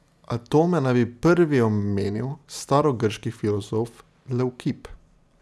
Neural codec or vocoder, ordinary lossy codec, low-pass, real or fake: none; none; none; real